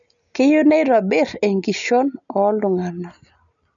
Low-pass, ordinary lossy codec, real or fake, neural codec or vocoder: 7.2 kHz; none; real; none